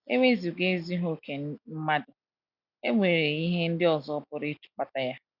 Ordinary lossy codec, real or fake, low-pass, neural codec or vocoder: none; real; 5.4 kHz; none